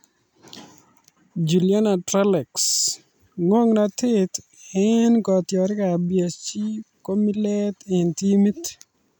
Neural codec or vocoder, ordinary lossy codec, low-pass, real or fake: none; none; none; real